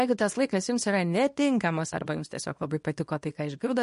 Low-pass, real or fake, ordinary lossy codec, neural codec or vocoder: 10.8 kHz; fake; MP3, 48 kbps; codec, 24 kHz, 0.9 kbps, WavTokenizer, small release